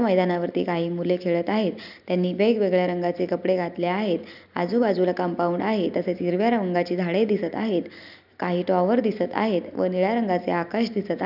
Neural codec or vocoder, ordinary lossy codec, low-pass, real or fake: none; none; 5.4 kHz; real